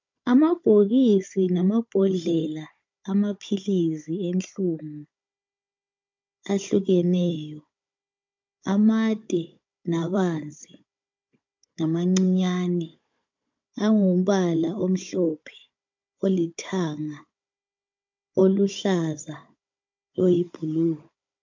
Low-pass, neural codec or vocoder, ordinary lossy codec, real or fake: 7.2 kHz; codec, 16 kHz, 16 kbps, FunCodec, trained on Chinese and English, 50 frames a second; MP3, 48 kbps; fake